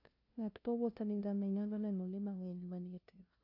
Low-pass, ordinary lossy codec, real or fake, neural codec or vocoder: 5.4 kHz; AAC, 48 kbps; fake; codec, 16 kHz, 0.5 kbps, FunCodec, trained on LibriTTS, 25 frames a second